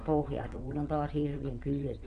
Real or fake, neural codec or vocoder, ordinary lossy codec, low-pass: fake; vocoder, 22.05 kHz, 80 mel bands, WaveNeXt; MP3, 64 kbps; 9.9 kHz